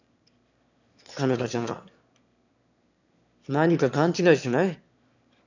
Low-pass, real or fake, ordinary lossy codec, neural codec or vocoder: 7.2 kHz; fake; none; autoencoder, 22.05 kHz, a latent of 192 numbers a frame, VITS, trained on one speaker